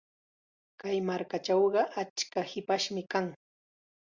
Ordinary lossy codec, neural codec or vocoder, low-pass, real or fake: Opus, 64 kbps; none; 7.2 kHz; real